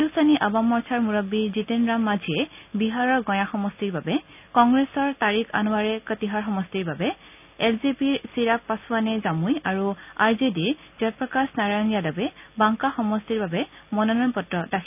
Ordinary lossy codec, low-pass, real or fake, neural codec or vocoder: none; 3.6 kHz; real; none